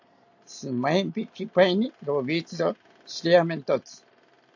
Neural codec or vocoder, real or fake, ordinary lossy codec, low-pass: none; real; AAC, 48 kbps; 7.2 kHz